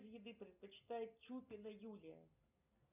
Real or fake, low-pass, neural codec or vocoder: real; 3.6 kHz; none